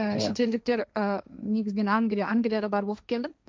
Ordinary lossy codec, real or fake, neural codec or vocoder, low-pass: none; fake; codec, 16 kHz, 1.1 kbps, Voila-Tokenizer; none